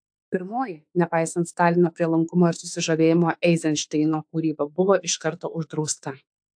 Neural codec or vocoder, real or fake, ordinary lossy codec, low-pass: autoencoder, 48 kHz, 32 numbers a frame, DAC-VAE, trained on Japanese speech; fake; AAC, 64 kbps; 9.9 kHz